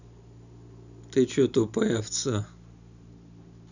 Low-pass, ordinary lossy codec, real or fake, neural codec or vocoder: 7.2 kHz; none; real; none